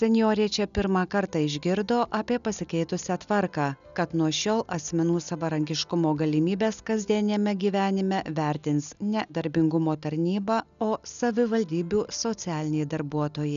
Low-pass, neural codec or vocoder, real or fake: 7.2 kHz; none; real